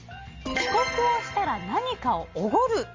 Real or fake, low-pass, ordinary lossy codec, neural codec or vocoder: real; 7.2 kHz; Opus, 32 kbps; none